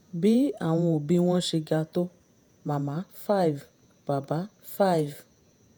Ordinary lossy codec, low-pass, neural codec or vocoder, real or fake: none; none; vocoder, 48 kHz, 128 mel bands, Vocos; fake